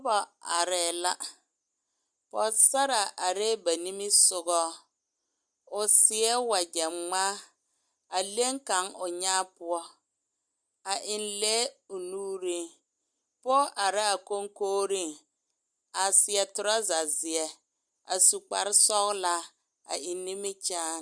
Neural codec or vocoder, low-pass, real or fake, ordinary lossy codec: none; 9.9 kHz; real; Opus, 64 kbps